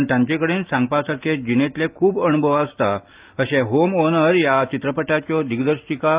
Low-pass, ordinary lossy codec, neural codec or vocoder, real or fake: 3.6 kHz; Opus, 32 kbps; none; real